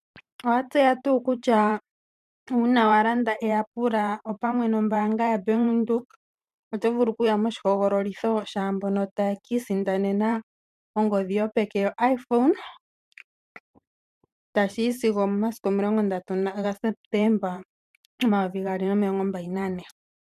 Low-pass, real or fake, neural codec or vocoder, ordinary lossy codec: 14.4 kHz; fake; vocoder, 44.1 kHz, 128 mel bands every 512 samples, BigVGAN v2; MP3, 96 kbps